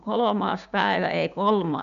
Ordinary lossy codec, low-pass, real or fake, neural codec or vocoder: none; 7.2 kHz; fake; codec, 16 kHz, 6 kbps, DAC